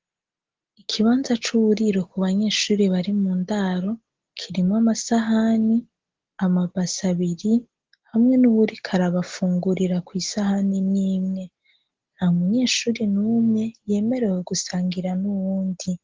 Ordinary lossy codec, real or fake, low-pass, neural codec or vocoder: Opus, 16 kbps; real; 7.2 kHz; none